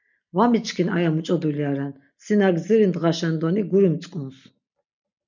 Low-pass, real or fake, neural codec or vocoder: 7.2 kHz; real; none